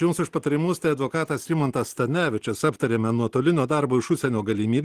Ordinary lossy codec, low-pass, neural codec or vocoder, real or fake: Opus, 16 kbps; 14.4 kHz; none; real